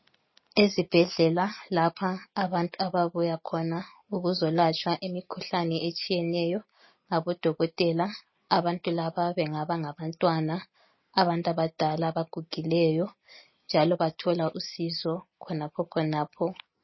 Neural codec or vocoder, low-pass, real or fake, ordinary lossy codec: none; 7.2 kHz; real; MP3, 24 kbps